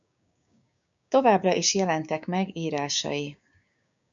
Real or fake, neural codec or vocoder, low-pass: fake; codec, 16 kHz, 6 kbps, DAC; 7.2 kHz